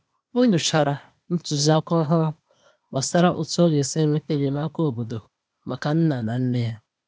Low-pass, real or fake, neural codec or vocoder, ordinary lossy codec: none; fake; codec, 16 kHz, 0.8 kbps, ZipCodec; none